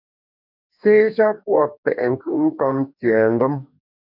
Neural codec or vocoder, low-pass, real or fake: codec, 44.1 kHz, 2.6 kbps, DAC; 5.4 kHz; fake